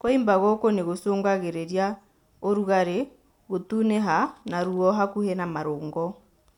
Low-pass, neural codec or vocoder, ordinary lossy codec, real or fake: 19.8 kHz; none; none; real